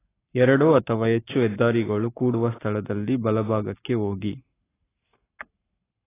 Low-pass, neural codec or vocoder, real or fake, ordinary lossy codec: 3.6 kHz; codec, 44.1 kHz, 7.8 kbps, Pupu-Codec; fake; AAC, 16 kbps